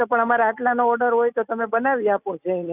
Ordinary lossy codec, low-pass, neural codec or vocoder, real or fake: none; 3.6 kHz; none; real